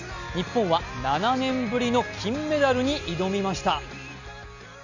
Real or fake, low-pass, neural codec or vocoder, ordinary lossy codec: real; 7.2 kHz; none; none